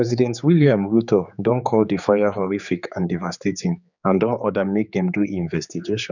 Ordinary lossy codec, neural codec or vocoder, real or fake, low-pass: none; codec, 16 kHz, 4 kbps, X-Codec, HuBERT features, trained on general audio; fake; 7.2 kHz